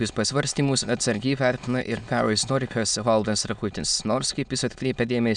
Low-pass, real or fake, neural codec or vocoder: 9.9 kHz; fake; autoencoder, 22.05 kHz, a latent of 192 numbers a frame, VITS, trained on many speakers